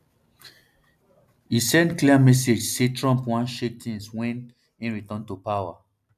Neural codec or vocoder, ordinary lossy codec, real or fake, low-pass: none; none; real; 14.4 kHz